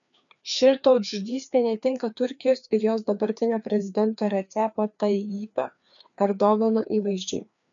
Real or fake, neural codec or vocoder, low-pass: fake; codec, 16 kHz, 2 kbps, FreqCodec, larger model; 7.2 kHz